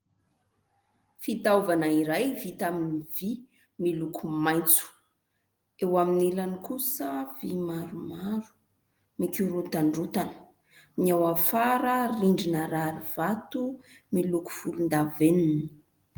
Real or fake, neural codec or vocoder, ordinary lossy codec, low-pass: real; none; Opus, 24 kbps; 19.8 kHz